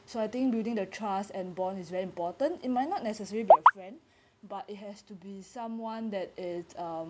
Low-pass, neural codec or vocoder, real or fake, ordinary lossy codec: none; none; real; none